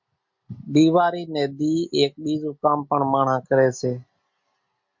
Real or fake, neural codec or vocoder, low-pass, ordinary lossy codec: real; none; 7.2 kHz; MP3, 48 kbps